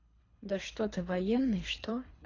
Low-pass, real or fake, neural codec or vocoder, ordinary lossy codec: 7.2 kHz; fake; codec, 24 kHz, 3 kbps, HILCodec; AAC, 32 kbps